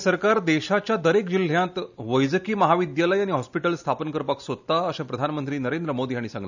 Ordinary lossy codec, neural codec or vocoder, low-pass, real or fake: none; none; 7.2 kHz; real